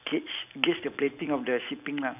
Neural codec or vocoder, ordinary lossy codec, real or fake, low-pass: vocoder, 44.1 kHz, 128 mel bands every 256 samples, BigVGAN v2; none; fake; 3.6 kHz